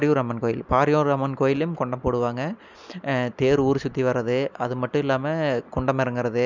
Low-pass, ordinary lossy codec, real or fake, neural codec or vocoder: 7.2 kHz; none; real; none